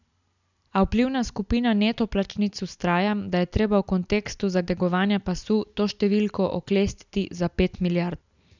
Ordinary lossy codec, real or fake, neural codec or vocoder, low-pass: none; real; none; 7.2 kHz